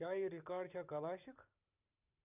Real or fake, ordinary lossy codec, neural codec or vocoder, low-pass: fake; none; vocoder, 44.1 kHz, 128 mel bands every 512 samples, BigVGAN v2; 3.6 kHz